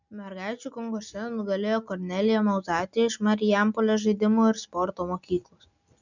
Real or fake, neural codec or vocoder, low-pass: real; none; 7.2 kHz